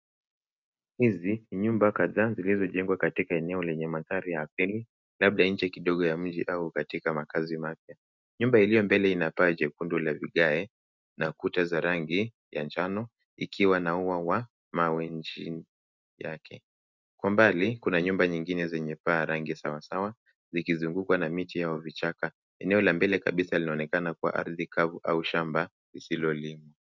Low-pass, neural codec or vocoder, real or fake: 7.2 kHz; none; real